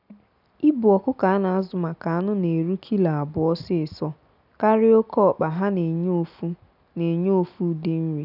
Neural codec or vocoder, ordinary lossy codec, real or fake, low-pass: none; none; real; 5.4 kHz